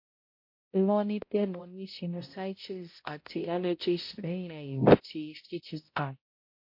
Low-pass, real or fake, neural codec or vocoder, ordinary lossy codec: 5.4 kHz; fake; codec, 16 kHz, 0.5 kbps, X-Codec, HuBERT features, trained on balanced general audio; MP3, 32 kbps